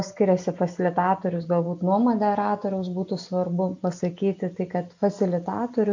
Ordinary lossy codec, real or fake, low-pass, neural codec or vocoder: AAC, 48 kbps; real; 7.2 kHz; none